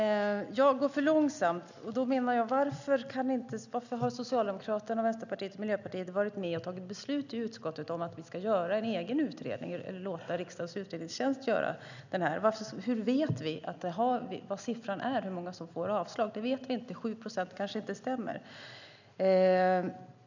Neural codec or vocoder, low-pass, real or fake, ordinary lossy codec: none; 7.2 kHz; real; none